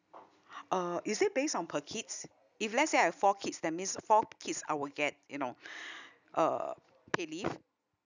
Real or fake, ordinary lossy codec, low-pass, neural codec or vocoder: real; none; 7.2 kHz; none